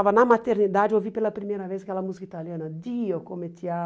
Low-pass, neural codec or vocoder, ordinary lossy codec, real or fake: none; none; none; real